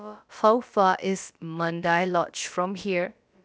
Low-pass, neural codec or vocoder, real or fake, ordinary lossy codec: none; codec, 16 kHz, about 1 kbps, DyCAST, with the encoder's durations; fake; none